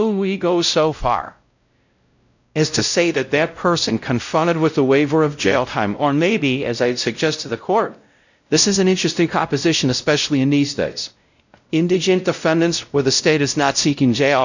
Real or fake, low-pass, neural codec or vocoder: fake; 7.2 kHz; codec, 16 kHz, 0.5 kbps, X-Codec, WavLM features, trained on Multilingual LibriSpeech